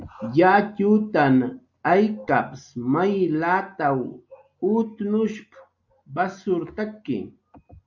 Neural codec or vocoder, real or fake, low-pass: none; real; 7.2 kHz